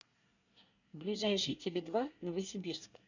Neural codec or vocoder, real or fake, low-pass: codec, 24 kHz, 1 kbps, SNAC; fake; 7.2 kHz